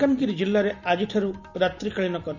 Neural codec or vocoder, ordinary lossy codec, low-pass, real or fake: none; none; 7.2 kHz; real